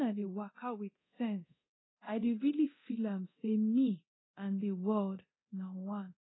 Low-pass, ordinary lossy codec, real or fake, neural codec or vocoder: 7.2 kHz; AAC, 16 kbps; fake; codec, 24 kHz, 0.9 kbps, DualCodec